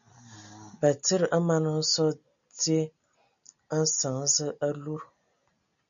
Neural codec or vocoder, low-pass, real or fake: none; 7.2 kHz; real